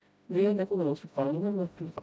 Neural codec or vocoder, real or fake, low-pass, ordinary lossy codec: codec, 16 kHz, 0.5 kbps, FreqCodec, smaller model; fake; none; none